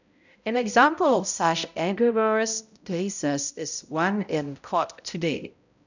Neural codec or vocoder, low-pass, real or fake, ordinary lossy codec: codec, 16 kHz, 0.5 kbps, X-Codec, HuBERT features, trained on balanced general audio; 7.2 kHz; fake; none